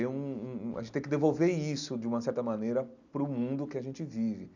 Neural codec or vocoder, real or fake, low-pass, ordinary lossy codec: none; real; 7.2 kHz; none